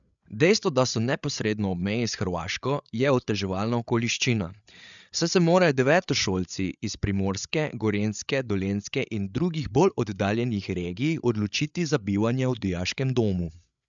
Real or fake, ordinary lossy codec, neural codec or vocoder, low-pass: fake; MP3, 96 kbps; codec, 16 kHz, 8 kbps, FreqCodec, larger model; 7.2 kHz